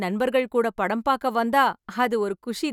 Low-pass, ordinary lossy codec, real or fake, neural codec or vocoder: 19.8 kHz; none; real; none